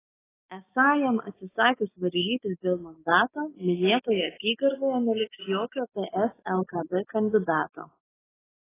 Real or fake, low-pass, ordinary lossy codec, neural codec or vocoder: fake; 3.6 kHz; AAC, 16 kbps; codec, 16 kHz, 6 kbps, DAC